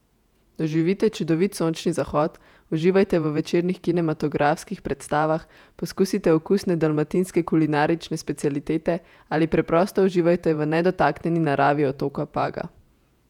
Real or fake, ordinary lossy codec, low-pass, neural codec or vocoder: fake; none; 19.8 kHz; vocoder, 48 kHz, 128 mel bands, Vocos